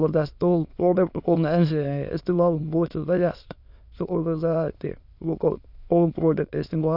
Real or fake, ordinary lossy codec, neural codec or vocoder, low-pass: fake; none; autoencoder, 22.05 kHz, a latent of 192 numbers a frame, VITS, trained on many speakers; 5.4 kHz